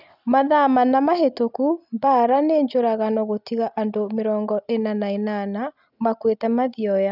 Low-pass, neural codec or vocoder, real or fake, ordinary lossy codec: 5.4 kHz; none; real; none